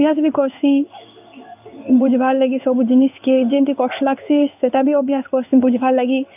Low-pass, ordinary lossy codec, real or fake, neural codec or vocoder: 3.6 kHz; none; fake; codec, 16 kHz in and 24 kHz out, 1 kbps, XY-Tokenizer